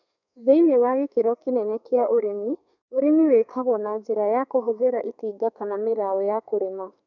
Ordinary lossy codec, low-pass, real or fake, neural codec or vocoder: none; 7.2 kHz; fake; codec, 32 kHz, 1.9 kbps, SNAC